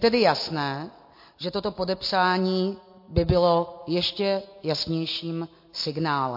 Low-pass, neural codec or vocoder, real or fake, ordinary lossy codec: 5.4 kHz; none; real; MP3, 32 kbps